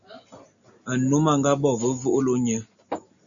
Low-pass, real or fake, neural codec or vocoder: 7.2 kHz; real; none